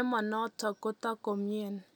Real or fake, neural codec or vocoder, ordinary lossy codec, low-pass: real; none; none; none